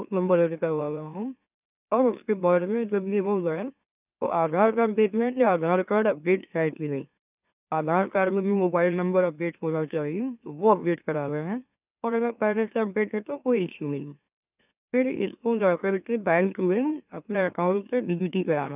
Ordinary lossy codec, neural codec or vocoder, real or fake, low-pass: none; autoencoder, 44.1 kHz, a latent of 192 numbers a frame, MeloTTS; fake; 3.6 kHz